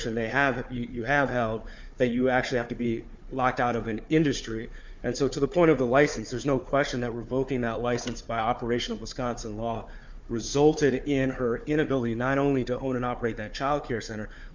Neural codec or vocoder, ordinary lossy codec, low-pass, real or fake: codec, 16 kHz, 4 kbps, FunCodec, trained on Chinese and English, 50 frames a second; MP3, 64 kbps; 7.2 kHz; fake